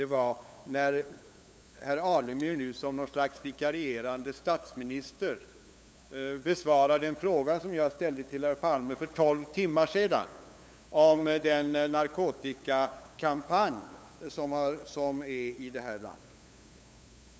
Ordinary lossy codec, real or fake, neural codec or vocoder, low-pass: none; fake; codec, 16 kHz, 8 kbps, FunCodec, trained on LibriTTS, 25 frames a second; none